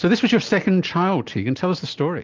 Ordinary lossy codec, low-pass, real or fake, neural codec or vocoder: Opus, 32 kbps; 7.2 kHz; real; none